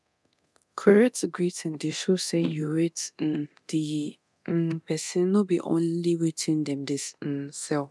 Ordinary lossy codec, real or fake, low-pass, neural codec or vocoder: none; fake; none; codec, 24 kHz, 0.9 kbps, DualCodec